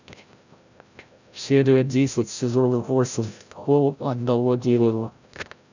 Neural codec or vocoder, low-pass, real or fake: codec, 16 kHz, 0.5 kbps, FreqCodec, larger model; 7.2 kHz; fake